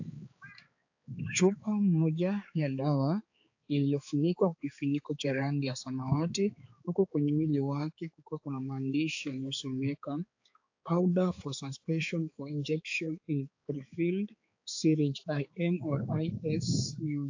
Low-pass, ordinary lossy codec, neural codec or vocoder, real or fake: 7.2 kHz; AAC, 48 kbps; codec, 16 kHz, 4 kbps, X-Codec, HuBERT features, trained on balanced general audio; fake